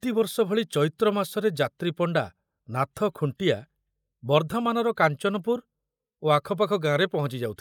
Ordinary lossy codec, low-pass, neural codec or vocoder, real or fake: none; 19.8 kHz; none; real